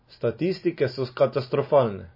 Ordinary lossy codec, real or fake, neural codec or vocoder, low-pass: MP3, 24 kbps; real; none; 5.4 kHz